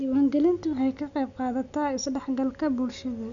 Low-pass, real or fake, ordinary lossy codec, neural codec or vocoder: 7.2 kHz; real; none; none